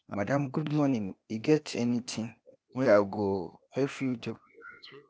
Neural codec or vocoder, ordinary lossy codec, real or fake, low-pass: codec, 16 kHz, 0.8 kbps, ZipCodec; none; fake; none